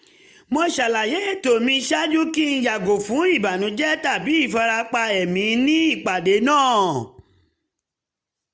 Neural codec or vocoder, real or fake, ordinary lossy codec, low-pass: none; real; none; none